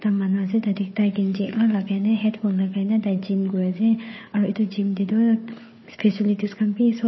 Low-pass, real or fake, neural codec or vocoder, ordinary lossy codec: 7.2 kHz; fake; codec, 16 kHz, 4 kbps, FunCodec, trained on Chinese and English, 50 frames a second; MP3, 24 kbps